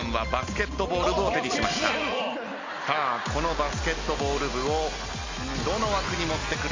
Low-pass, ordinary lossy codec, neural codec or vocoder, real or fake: 7.2 kHz; MP3, 64 kbps; none; real